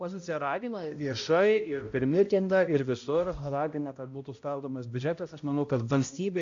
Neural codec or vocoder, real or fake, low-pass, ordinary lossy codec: codec, 16 kHz, 0.5 kbps, X-Codec, HuBERT features, trained on balanced general audio; fake; 7.2 kHz; AAC, 48 kbps